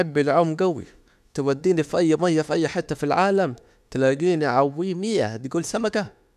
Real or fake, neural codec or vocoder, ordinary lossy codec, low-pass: fake; autoencoder, 48 kHz, 32 numbers a frame, DAC-VAE, trained on Japanese speech; none; 14.4 kHz